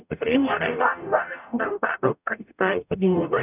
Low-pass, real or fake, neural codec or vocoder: 3.6 kHz; fake; codec, 44.1 kHz, 0.9 kbps, DAC